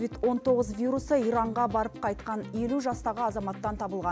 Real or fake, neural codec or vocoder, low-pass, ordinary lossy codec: real; none; none; none